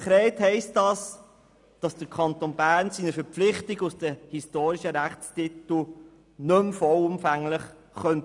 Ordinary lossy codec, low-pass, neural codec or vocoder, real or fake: none; 10.8 kHz; none; real